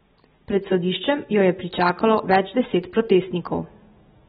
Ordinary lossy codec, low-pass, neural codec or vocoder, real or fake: AAC, 16 kbps; 10.8 kHz; none; real